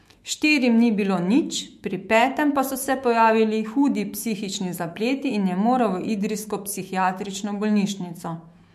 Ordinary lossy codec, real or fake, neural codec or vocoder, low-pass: MP3, 64 kbps; fake; autoencoder, 48 kHz, 128 numbers a frame, DAC-VAE, trained on Japanese speech; 14.4 kHz